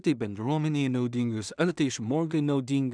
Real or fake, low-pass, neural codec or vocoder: fake; 9.9 kHz; codec, 16 kHz in and 24 kHz out, 0.4 kbps, LongCat-Audio-Codec, two codebook decoder